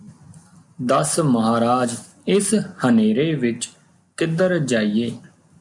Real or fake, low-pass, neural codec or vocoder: real; 10.8 kHz; none